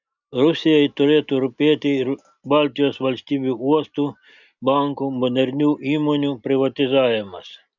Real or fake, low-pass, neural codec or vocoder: real; 7.2 kHz; none